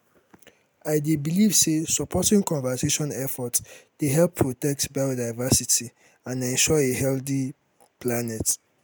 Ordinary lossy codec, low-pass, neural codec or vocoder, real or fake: none; none; none; real